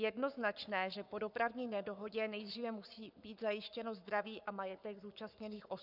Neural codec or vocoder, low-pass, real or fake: codec, 44.1 kHz, 7.8 kbps, Pupu-Codec; 5.4 kHz; fake